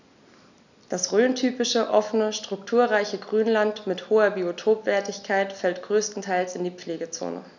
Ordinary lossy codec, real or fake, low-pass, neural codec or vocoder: none; real; 7.2 kHz; none